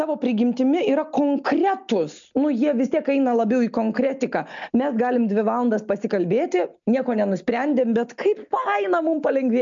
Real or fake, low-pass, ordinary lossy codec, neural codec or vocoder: real; 7.2 kHz; MP3, 64 kbps; none